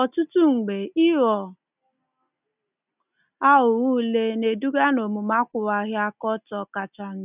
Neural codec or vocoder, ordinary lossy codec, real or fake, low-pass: none; none; real; 3.6 kHz